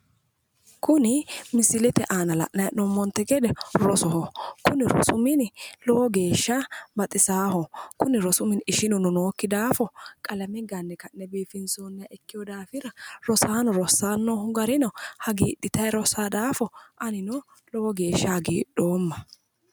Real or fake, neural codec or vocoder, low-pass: real; none; 19.8 kHz